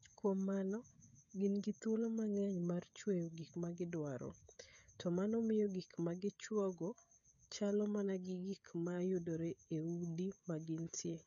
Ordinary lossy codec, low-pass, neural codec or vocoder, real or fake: none; 7.2 kHz; codec, 16 kHz, 16 kbps, FreqCodec, smaller model; fake